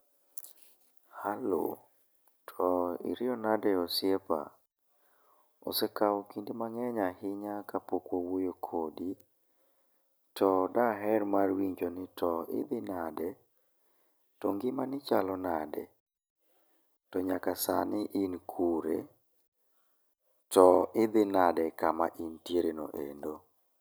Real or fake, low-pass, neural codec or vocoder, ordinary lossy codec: real; none; none; none